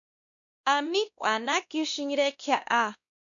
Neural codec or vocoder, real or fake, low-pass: codec, 16 kHz, 1 kbps, X-Codec, WavLM features, trained on Multilingual LibriSpeech; fake; 7.2 kHz